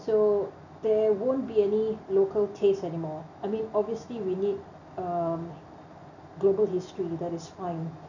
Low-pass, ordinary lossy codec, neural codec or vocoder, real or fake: 7.2 kHz; none; none; real